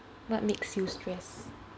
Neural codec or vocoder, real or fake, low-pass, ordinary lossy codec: none; real; none; none